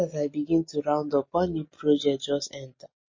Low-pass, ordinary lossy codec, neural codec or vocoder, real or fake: 7.2 kHz; MP3, 32 kbps; none; real